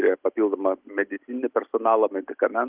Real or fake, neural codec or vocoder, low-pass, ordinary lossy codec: real; none; 3.6 kHz; Opus, 32 kbps